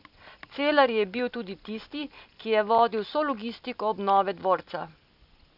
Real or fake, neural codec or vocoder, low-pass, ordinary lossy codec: real; none; 5.4 kHz; none